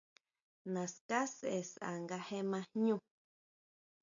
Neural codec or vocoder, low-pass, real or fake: none; 7.2 kHz; real